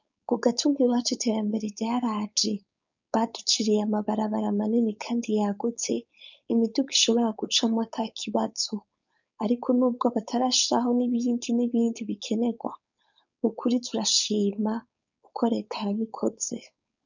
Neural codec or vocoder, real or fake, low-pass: codec, 16 kHz, 4.8 kbps, FACodec; fake; 7.2 kHz